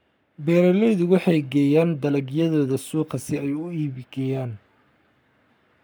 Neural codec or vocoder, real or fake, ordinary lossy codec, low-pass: codec, 44.1 kHz, 7.8 kbps, Pupu-Codec; fake; none; none